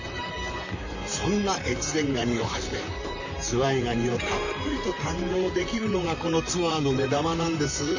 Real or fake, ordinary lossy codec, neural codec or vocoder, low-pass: fake; none; vocoder, 44.1 kHz, 128 mel bands, Pupu-Vocoder; 7.2 kHz